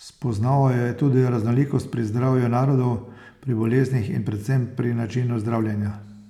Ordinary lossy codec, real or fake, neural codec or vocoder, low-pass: none; real; none; 19.8 kHz